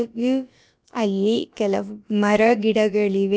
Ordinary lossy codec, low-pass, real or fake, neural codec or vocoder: none; none; fake; codec, 16 kHz, about 1 kbps, DyCAST, with the encoder's durations